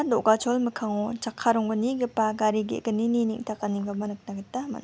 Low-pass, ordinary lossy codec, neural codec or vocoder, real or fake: none; none; none; real